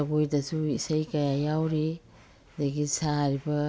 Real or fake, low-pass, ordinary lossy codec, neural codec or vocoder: real; none; none; none